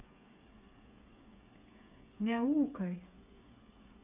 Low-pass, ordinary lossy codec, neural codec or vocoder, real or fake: 3.6 kHz; none; codec, 16 kHz, 8 kbps, FreqCodec, smaller model; fake